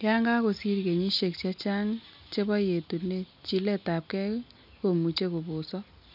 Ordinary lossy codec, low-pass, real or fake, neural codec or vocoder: none; 5.4 kHz; real; none